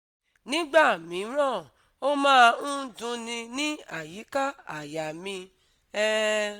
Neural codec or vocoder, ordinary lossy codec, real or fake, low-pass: none; Opus, 64 kbps; real; 19.8 kHz